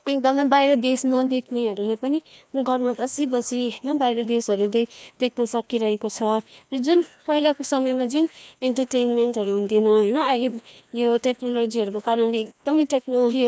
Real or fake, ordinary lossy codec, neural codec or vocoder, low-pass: fake; none; codec, 16 kHz, 1 kbps, FreqCodec, larger model; none